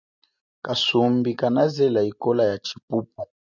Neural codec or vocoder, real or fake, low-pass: none; real; 7.2 kHz